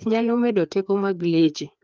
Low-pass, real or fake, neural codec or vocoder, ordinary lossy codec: 7.2 kHz; fake; codec, 16 kHz, 2 kbps, FreqCodec, larger model; Opus, 24 kbps